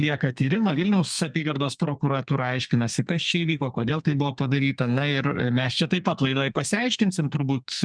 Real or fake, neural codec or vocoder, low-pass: fake; codec, 32 kHz, 1.9 kbps, SNAC; 9.9 kHz